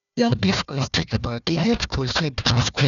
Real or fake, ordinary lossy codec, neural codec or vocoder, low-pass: fake; none; codec, 16 kHz, 1 kbps, FunCodec, trained on Chinese and English, 50 frames a second; 7.2 kHz